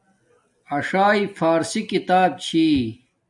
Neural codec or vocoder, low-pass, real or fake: none; 10.8 kHz; real